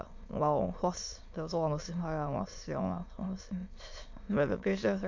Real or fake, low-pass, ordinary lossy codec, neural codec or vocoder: fake; 7.2 kHz; MP3, 48 kbps; autoencoder, 22.05 kHz, a latent of 192 numbers a frame, VITS, trained on many speakers